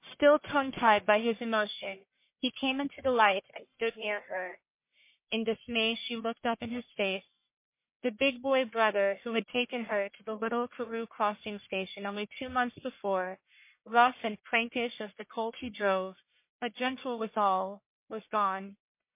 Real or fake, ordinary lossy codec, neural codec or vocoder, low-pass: fake; MP3, 24 kbps; codec, 44.1 kHz, 1.7 kbps, Pupu-Codec; 3.6 kHz